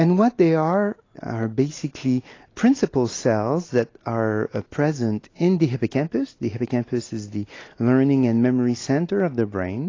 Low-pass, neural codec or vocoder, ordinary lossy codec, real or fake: 7.2 kHz; none; AAC, 32 kbps; real